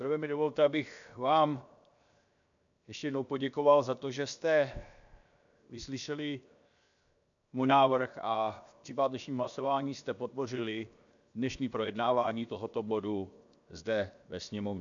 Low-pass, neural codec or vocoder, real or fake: 7.2 kHz; codec, 16 kHz, 0.7 kbps, FocalCodec; fake